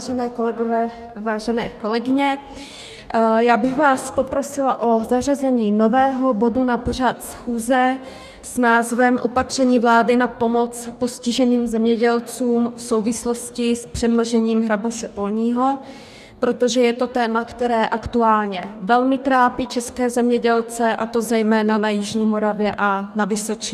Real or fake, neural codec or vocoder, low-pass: fake; codec, 44.1 kHz, 2.6 kbps, DAC; 14.4 kHz